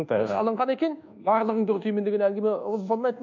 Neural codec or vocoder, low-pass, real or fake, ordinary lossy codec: codec, 24 kHz, 1.2 kbps, DualCodec; 7.2 kHz; fake; none